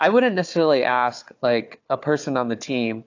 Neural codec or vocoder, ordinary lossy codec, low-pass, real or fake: codec, 16 kHz, 4 kbps, FunCodec, trained on Chinese and English, 50 frames a second; AAC, 48 kbps; 7.2 kHz; fake